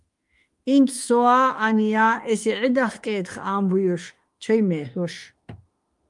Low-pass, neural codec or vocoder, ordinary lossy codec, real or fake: 10.8 kHz; autoencoder, 48 kHz, 32 numbers a frame, DAC-VAE, trained on Japanese speech; Opus, 32 kbps; fake